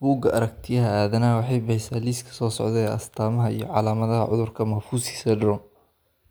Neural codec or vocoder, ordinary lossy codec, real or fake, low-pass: none; none; real; none